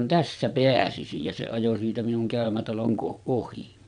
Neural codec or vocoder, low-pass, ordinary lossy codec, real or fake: vocoder, 22.05 kHz, 80 mel bands, WaveNeXt; 9.9 kHz; none; fake